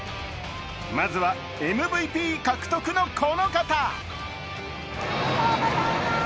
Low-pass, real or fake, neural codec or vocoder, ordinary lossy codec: none; real; none; none